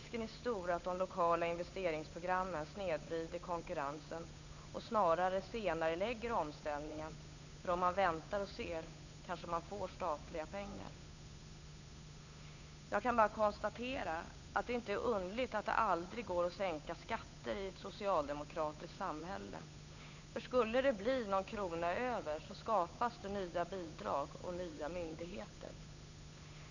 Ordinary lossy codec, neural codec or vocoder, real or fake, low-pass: none; codec, 16 kHz, 8 kbps, FunCodec, trained on Chinese and English, 25 frames a second; fake; 7.2 kHz